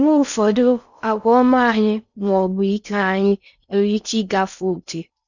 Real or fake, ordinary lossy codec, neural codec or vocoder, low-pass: fake; none; codec, 16 kHz in and 24 kHz out, 0.6 kbps, FocalCodec, streaming, 4096 codes; 7.2 kHz